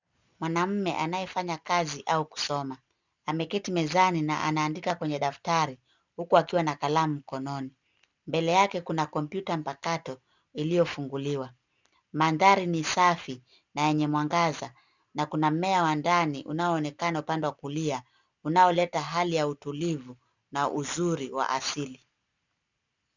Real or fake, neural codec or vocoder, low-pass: real; none; 7.2 kHz